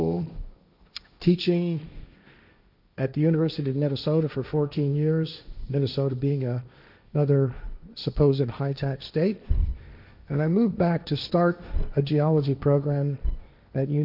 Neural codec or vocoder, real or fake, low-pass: codec, 16 kHz, 1.1 kbps, Voila-Tokenizer; fake; 5.4 kHz